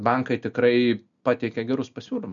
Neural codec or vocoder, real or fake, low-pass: none; real; 7.2 kHz